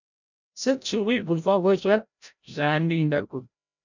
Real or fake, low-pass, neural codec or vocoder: fake; 7.2 kHz; codec, 16 kHz, 0.5 kbps, FreqCodec, larger model